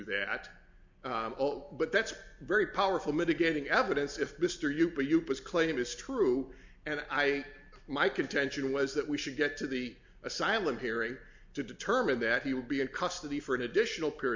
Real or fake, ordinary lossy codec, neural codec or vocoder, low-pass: real; MP3, 64 kbps; none; 7.2 kHz